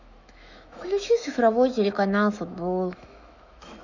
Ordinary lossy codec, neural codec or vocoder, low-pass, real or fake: none; autoencoder, 48 kHz, 128 numbers a frame, DAC-VAE, trained on Japanese speech; 7.2 kHz; fake